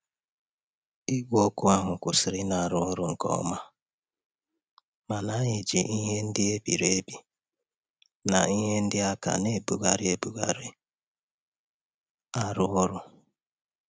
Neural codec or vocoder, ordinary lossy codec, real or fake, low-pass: none; none; real; none